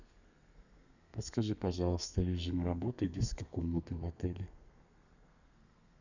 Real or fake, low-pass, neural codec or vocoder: fake; 7.2 kHz; codec, 32 kHz, 1.9 kbps, SNAC